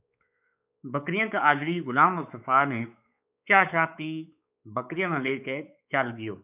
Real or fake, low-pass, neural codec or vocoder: fake; 3.6 kHz; codec, 16 kHz, 4 kbps, X-Codec, WavLM features, trained on Multilingual LibriSpeech